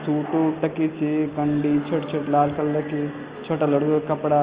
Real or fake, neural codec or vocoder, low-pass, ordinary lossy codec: real; none; 3.6 kHz; Opus, 24 kbps